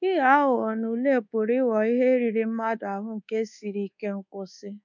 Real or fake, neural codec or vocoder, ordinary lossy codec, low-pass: fake; codec, 24 kHz, 1.2 kbps, DualCodec; none; 7.2 kHz